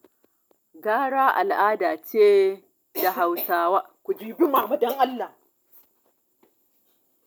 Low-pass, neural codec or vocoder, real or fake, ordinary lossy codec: none; none; real; none